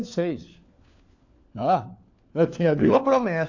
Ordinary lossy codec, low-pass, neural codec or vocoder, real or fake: AAC, 48 kbps; 7.2 kHz; codec, 16 kHz, 4 kbps, FunCodec, trained on LibriTTS, 50 frames a second; fake